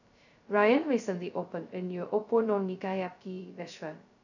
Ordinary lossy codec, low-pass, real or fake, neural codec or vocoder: none; 7.2 kHz; fake; codec, 16 kHz, 0.2 kbps, FocalCodec